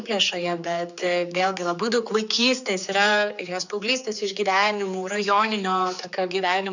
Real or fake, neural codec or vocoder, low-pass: fake; codec, 16 kHz, 4 kbps, X-Codec, HuBERT features, trained on general audio; 7.2 kHz